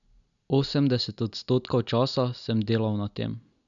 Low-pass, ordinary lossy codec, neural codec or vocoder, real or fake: 7.2 kHz; none; none; real